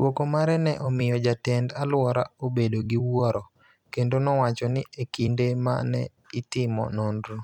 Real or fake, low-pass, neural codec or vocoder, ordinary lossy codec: fake; 19.8 kHz; vocoder, 44.1 kHz, 128 mel bands every 256 samples, BigVGAN v2; none